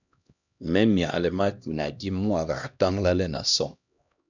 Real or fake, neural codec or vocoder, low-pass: fake; codec, 16 kHz, 1 kbps, X-Codec, HuBERT features, trained on LibriSpeech; 7.2 kHz